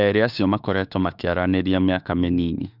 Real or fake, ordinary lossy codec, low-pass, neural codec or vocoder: fake; none; 5.4 kHz; autoencoder, 48 kHz, 32 numbers a frame, DAC-VAE, trained on Japanese speech